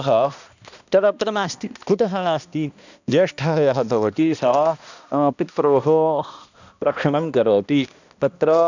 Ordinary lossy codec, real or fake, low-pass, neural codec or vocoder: none; fake; 7.2 kHz; codec, 16 kHz, 1 kbps, X-Codec, HuBERT features, trained on balanced general audio